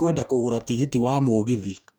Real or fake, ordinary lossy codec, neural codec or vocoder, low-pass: fake; none; codec, 44.1 kHz, 2.6 kbps, DAC; none